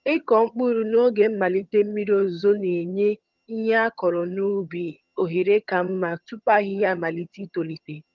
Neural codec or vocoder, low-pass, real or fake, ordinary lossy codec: vocoder, 22.05 kHz, 80 mel bands, HiFi-GAN; 7.2 kHz; fake; Opus, 32 kbps